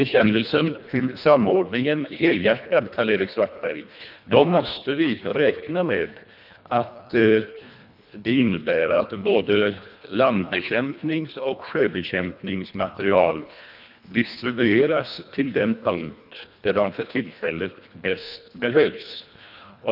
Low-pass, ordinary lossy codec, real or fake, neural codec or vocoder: 5.4 kHz; none; fake; codec, 24 kHz, 1.5 kbps, HILCodec